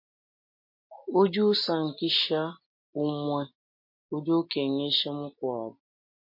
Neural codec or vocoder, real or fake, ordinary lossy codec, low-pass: none; real; MP3, 32 kbps; 5.4 kHz